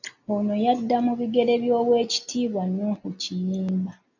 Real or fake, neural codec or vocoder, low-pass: real; none; 7.2 kHz